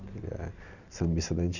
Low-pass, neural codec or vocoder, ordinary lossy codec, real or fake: 7.2 kHz; none; none; real